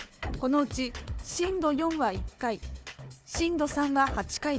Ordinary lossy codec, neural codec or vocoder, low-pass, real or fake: none; codec, 16 kHz, 4 kbps, FunCodec, trained on Chinese and English, 50 frames a second; none; fake